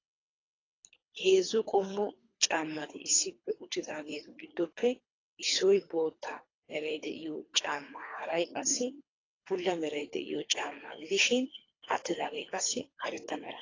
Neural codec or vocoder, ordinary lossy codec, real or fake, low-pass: codec, 24 kHz, 3 kbps, HILCodec; AAC, 32 kbps; fake; 7.2 kHz